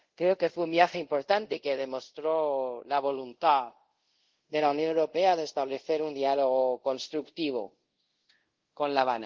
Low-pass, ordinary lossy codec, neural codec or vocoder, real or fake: 7.2 kHz; Opus, 16 kbps; codec, 24 kHz, 0.5 kbps, DualCodec; fake